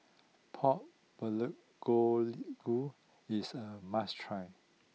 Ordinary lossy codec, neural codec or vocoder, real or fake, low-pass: none; none; real; none